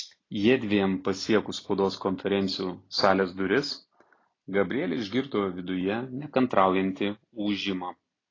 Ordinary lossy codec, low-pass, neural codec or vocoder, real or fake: AAC, 32 kbps; 7.2 kHz; none; real